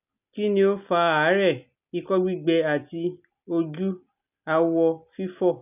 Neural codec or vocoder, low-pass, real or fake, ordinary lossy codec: none; 3.6 kHz; real; none